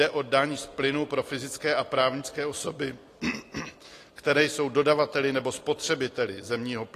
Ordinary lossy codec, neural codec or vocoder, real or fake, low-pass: AAC, 48 kbps; none; real; 14.4 kHz